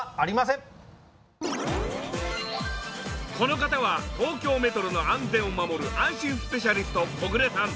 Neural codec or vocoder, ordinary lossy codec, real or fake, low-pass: none; none; real; none